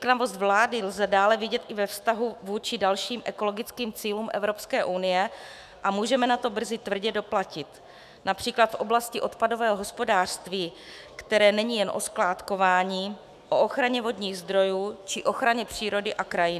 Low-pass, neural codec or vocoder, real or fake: 14.4 kHz; autoencoder, 48 kHz, 128 numbers a frame, DAC-VAE, trained on Japanese speech; fake